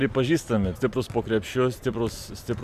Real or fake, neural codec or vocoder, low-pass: real; none; 14.4 kHz